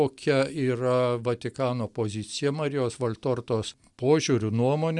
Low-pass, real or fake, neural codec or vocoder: 10.8 kHz; real; none